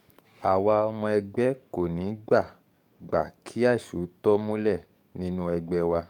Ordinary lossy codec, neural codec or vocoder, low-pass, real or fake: none; codec, 44.1 kHz, 7.8 kbps, DAC; 19.8 kHz; fake